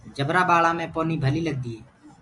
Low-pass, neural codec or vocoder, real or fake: 10.8 kHz; none; real